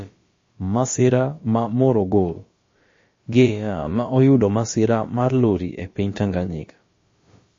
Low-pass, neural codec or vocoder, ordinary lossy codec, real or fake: 7.2 kHz; codec, 16 kHz, about 1 kbps, DyCAST, with the encoder's durations; MP3, 32 kbps; fake